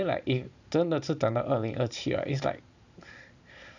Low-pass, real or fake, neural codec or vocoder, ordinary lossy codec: 7.2 kHz; fake; vocoder, 44.1 kHz, 128 mel bands every 512 samples, BigVGAN v2; none